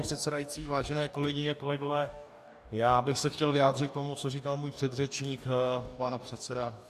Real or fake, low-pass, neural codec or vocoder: fake; 14.4 kHz; codec, 44.1 kHz, 2.6 kbps, DAC